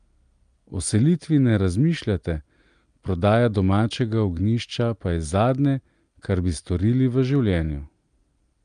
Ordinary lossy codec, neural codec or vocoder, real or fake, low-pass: Opus, 32 kbps; none; real; 9.9 kHz